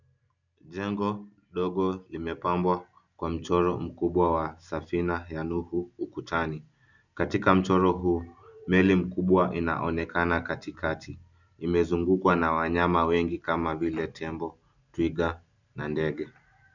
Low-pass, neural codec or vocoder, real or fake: 7.2 kHz; none; real